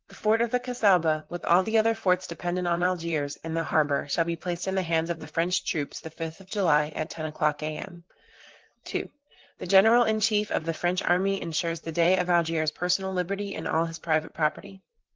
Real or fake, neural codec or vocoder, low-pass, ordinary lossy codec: fake; vocoder, 44.1 kHz, 128 mel bands, Pupu-Vocoder; 7.2 kHz; Opus, 16 kbps